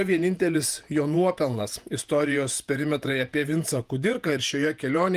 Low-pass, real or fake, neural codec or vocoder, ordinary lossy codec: 14.4 kHz; fake; vocoder, 48 kHz, 128 mel bands, Vocos; Opus, 32 kbps